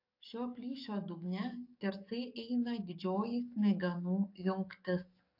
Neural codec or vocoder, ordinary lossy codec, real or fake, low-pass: codec, 44.1 kHz, 7.8 kbps, DAC; AAC, 48 kbps; fake; 5.4 kHz